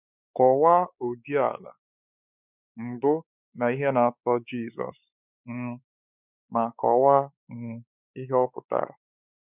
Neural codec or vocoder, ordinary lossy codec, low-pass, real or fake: codec, 16 kHz, 4 kbps, X-Codec, WavLM features, trained on Multilingual LibriSpeech; none; 3.6 kHz; fake